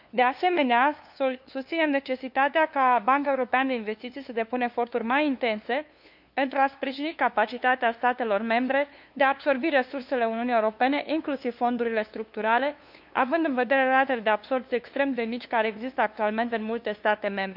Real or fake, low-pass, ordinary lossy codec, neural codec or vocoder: fake; 5.4 kHz; none; codec, 16 kHz, 2 kbps, FunCodec, trained on LibriTTS, 25 frames a second